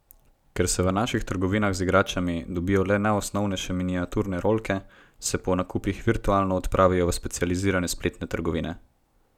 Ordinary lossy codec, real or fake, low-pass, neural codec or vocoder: none; real; 19.8 kHz; none